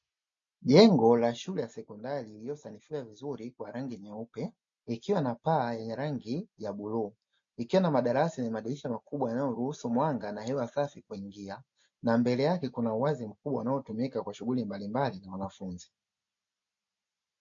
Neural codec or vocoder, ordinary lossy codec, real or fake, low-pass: none; MP3, 48 kbps; real; 7.2 kHz